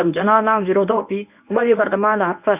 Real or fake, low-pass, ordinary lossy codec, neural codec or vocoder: fake; 3.6 kHz; none; codec, 24 kHz, 0.9 kbps, WavTokenizer, medium speech release version 1